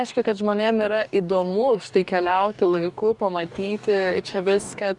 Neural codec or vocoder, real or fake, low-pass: codec, 44.1 kHz, 2.6 kbps, DAC; fake; 10.8 kHz